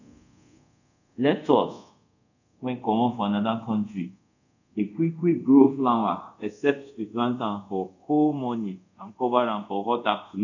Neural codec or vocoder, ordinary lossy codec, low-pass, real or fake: codec, 24 kHz, 0.5 kbps, DualCodec; none; 7.2 kHz; fake